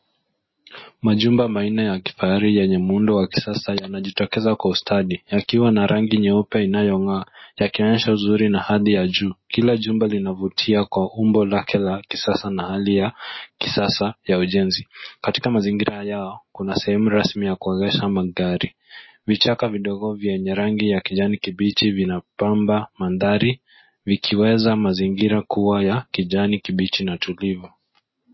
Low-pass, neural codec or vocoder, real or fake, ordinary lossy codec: 7.2 kHz; none; real; MP3, 24 kbps